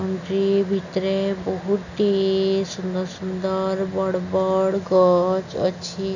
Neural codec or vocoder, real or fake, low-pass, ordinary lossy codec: none; real; 7.2 kHz; none